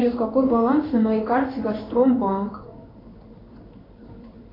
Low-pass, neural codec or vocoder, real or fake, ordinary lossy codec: 5.4 kHz; codec, 16 kHz in and 24 kHz out, 1 kbps, XY-Tokenizer; fake; AAC, 24 kbps